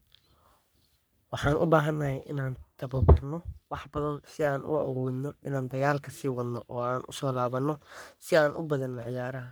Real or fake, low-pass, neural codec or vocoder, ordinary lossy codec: fake; none; codec, 44.1 kHz, 3.4 kbps, Pupu-Codec; none